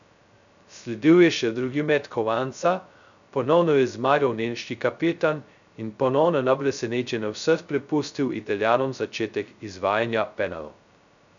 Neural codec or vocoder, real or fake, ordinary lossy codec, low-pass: codec, 16 kHz, 0.2 kbps, FocalCodec; fake; none; 7.2 kHz